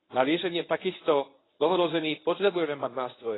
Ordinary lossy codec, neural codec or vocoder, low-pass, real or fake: AAC, 16 kbps; codec, 24 kHz, 0.9 kbps, WavTokenizer, medium speech release version 1; 7.2 kHz; fake